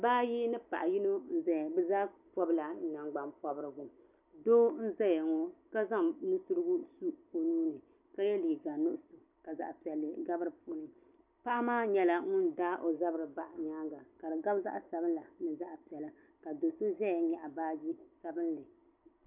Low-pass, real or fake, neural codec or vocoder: 3.6 kHz; real; none